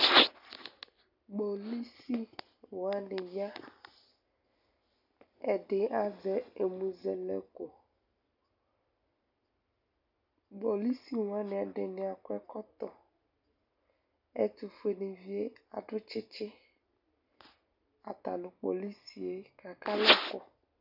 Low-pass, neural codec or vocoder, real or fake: 5.4 kHz; none; real